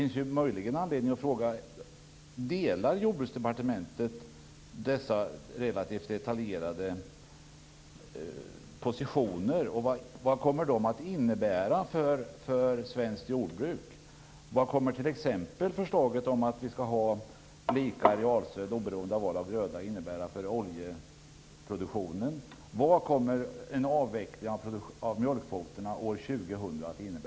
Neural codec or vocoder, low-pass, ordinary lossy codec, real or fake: none; none; none; real